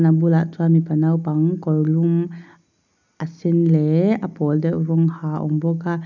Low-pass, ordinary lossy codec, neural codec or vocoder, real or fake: 7.2 kHz; none; none; real